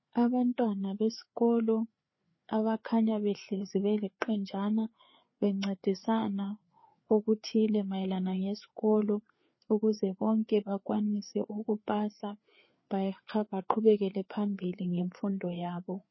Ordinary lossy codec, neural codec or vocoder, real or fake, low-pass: MP3, 24 kbps; codec, 16 kHz, 4 kbps, FreqCodec, larger model; fake; 7.2 kHz